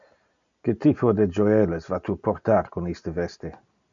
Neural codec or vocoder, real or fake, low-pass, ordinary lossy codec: none; real; 7.2 kHz; MP3, 64 kbps